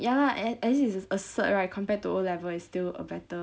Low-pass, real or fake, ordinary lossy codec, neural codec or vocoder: none; real; none; none